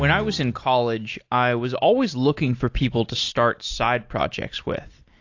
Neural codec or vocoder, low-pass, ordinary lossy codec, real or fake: none; 7.2 kHz; AAC, 48 kbps; real